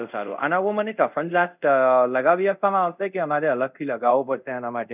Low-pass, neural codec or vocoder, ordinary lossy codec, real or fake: 3.6 kHz; codec, 24 kHz, 0.5 kbps, DualCodec; none; fake